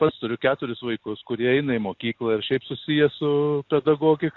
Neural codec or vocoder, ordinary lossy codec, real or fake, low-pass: none; AAC, 48 kbps; real; 7.2 kHz